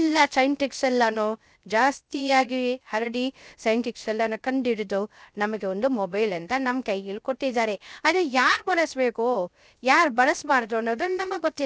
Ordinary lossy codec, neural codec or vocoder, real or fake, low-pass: none; codec, 16 kHz, 0.3 kbps, FocalCodec; fake; none